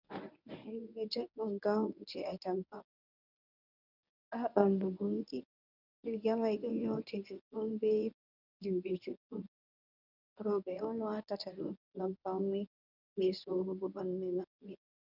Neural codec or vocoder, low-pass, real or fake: codec, 24 kHz, 0.9 kbps, WavTokenizer, medium speech release version 1; 5.4 kHz; fake